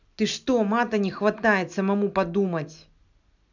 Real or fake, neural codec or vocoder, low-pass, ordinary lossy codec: real; none; 7.2 kHz; none